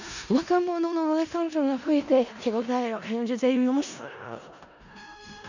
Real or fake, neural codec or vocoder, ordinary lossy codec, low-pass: fake; codec, 16 kHz in and 24 kHz out, 0.4 kbps, LongCat-Audio-Codec, four codebook decoder; none; 7.2 kHz